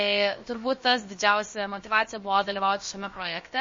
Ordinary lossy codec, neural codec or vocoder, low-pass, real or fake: MP3, 32 kbps; codec, 16 kHz, about 1 kbps, DyCAST, with the encoder's durations; 7.2 kHz; fake